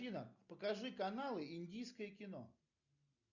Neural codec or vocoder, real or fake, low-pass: none; real; 7.2 kHz